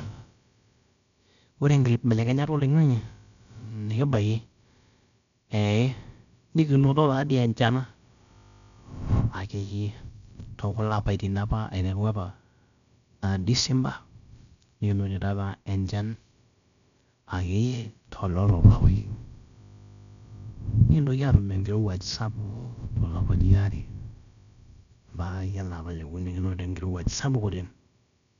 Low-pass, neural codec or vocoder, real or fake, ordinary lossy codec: 7.2 kHz; codec, 16 kHz, about 1 kbps, DyCAST, with the encoder's durations; fake; none